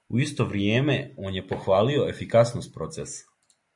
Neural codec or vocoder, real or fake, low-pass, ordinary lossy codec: none; real; 10.8 kHz; MP3, 64 kbps